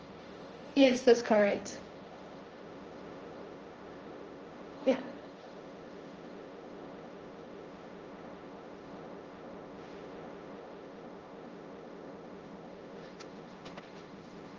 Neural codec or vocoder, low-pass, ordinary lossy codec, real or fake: codec, 16 kHz, 1.1 kbps, Voila-Tokenizer; 7.2 kHz; Opus, 24 kbps; fake